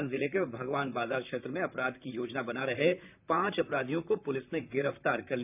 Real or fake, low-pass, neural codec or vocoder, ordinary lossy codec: fake; 3.6 kHz; vocoder, 44.1 kHz, 128 mel bands, Pupu-Vocoder; none